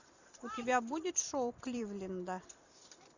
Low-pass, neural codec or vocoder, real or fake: 7.2 kHz; vocoder, 44.1 kHz, 128 mel bands every 256 samples, BigVGAN v2; fake